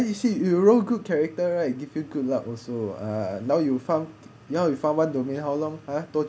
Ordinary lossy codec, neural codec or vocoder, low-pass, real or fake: none; none; none; real